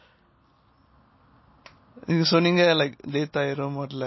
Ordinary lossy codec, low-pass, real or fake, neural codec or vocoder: MP3, 24 kbps; 7.2 kHz; real; none